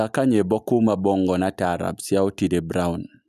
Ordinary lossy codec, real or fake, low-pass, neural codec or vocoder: none; real; 14.4 kHz; none